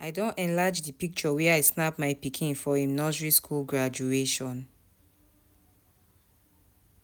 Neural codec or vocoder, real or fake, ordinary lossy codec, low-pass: none; real; none; none